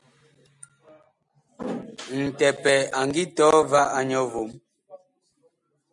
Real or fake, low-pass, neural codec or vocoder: real; 10.8 kHz; none